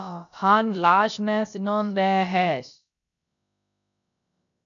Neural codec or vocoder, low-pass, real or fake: codec, 16 kHz, about 1 kbps, DyCAST, with the encoder's durations; 7.2 kHz; fake